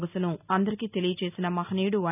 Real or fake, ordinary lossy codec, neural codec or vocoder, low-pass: real; none; none; 3.6 kHz